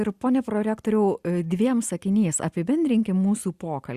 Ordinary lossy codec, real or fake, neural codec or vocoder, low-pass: Opus, 64 kbps; real; none; 14.4 kHz